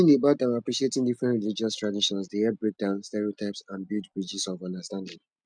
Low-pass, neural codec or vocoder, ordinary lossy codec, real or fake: 9.9 kHz; none; none; real